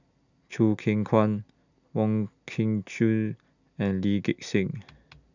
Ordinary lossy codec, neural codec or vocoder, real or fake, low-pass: none; none; real; 7.2 kHz